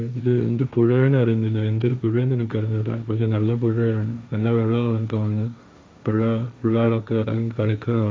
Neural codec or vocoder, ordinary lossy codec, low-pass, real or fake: codec, 16 kHz, 1.1 kbps, Voila-Tokenizer; none; none; fake